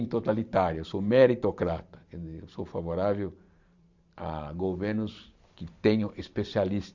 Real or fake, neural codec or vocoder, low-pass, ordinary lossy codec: fake; vocoder, 44.1 kHz, 128 mel bands every 512 samples, BigVGAN v2; 7.2 kHz; Opus, 64 kbps